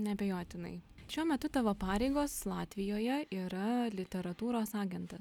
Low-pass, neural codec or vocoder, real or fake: 19.8 kHz; none; real